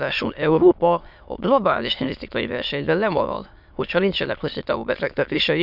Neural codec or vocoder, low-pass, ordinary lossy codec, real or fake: autoencoder, 22.05 kHz, a latent of 192 numbers a frame, VITS, trained on many speakers; 5.4 kHz; none; fake